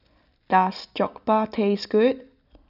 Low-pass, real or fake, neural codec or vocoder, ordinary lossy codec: 5.4 kHz; real; none; none